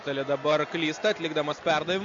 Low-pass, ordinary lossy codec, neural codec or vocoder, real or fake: 7.2 kHz; MP3, 48 kbps; none; real